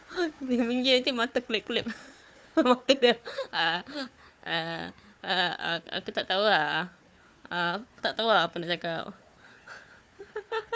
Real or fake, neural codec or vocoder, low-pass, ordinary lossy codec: fake; codec, 16 kHz, 4 kbps, FunCodec, trained on Chinese and English, 50 frames a second; none; none